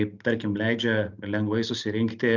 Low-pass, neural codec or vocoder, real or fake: 7.2 kHz; none; real